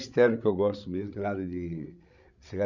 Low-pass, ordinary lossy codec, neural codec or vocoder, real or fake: 7.2 kHz; none; codec, 16 kHz, 16 kbps, FreqCodec, larger model; fake